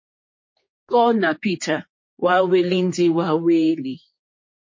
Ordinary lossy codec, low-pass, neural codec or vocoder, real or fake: MP3, 32 kbps; 7.2 kHz; codec, 16 kHz, 4 kbps, X-Codec, HuBERT features, trained on general audio; fake